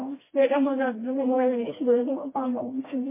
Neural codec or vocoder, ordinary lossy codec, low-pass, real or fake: codec, 16 kHz, 1 kbps, FreqCodec, smaller model; MP3, 16 kbps; 3.6 kHz; fake